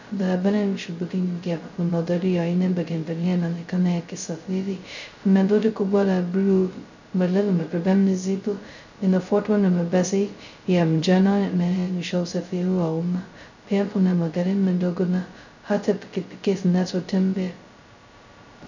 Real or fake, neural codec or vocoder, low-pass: fake; codec, 16 kHz, 0.2 kbps, FocalCodec; 7.2 kHz